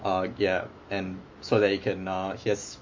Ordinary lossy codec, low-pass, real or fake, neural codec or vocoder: MP3, 48 kbps; 7.2 kHz; fake; autoencoder, 48 kHz, 128 numbers a frame, DAC-VAE, trained on Japanese speech